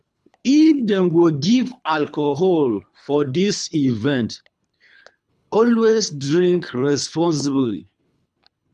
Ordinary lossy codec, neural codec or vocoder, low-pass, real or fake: none; codec, 24 kHz, 3 kbps, HILCodec; none; fake